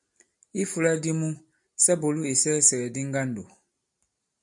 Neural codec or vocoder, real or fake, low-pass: none; real; 10.8 kHz